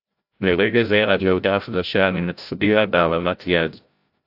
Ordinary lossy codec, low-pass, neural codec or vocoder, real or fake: AAC, 48 kbps; 5.4 kHz; codec, 16 kHz, 0.5 kbps, FreqCodec, larger model; fake